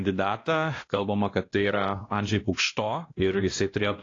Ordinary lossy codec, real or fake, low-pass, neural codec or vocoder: AAC, 32 kbps; fake; 7.2 kHz; codec, 16 kHz, 1 kbps, X-Codec, WavLM features, trained on Multilingual LibriSpeech